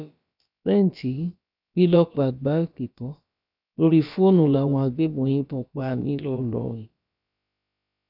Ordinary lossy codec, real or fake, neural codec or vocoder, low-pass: none; fake; codec, 16 kHz, about 1 kbps, DyCAST, with the encoder's durations; 5.4 kHz